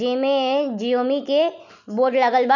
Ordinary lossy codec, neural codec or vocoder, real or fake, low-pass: none; none; real; 7.2 kHz